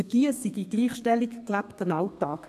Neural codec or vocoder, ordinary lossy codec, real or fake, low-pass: codec, 32 kHz, 1.9 kbps, SNAC; none; fake; 14.4 kHz